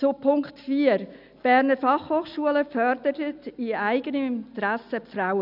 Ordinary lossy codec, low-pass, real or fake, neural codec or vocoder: none; 5.4 kHz; real; none